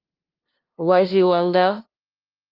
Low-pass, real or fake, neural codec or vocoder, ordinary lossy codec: 5.4 kHz; fake; codec, 16 kHz, 0.5 kbps, FunCodec, trained on LibriTTS, 25 frames a second; Opus, 24 kbps